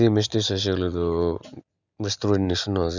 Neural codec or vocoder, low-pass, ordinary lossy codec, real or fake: none; 7.2 kHz; none; real